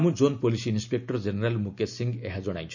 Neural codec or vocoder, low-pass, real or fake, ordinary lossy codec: none; 7.2 kHz; real; none